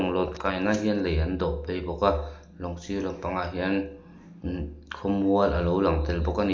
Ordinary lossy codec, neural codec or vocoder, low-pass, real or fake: none; none; none; real